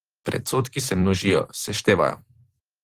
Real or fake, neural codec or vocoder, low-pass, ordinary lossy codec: fake; vocoder, 44.1 kHz, 128 mel bands every 512 samples, BigVGAN v2; 14.4 kHz; Opus, 16 kbps